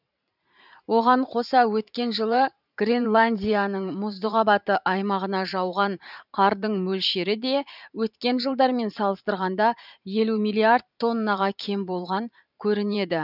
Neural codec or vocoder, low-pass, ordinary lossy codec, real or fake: vocoder, 44.1 kHz, 128 mel bands every 512 samples, BigVGAN v2; 5.4 kHz; none; fake